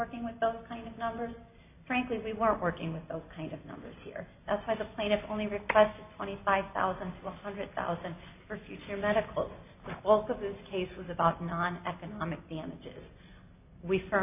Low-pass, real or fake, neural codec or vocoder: 3.6 kHz; real; none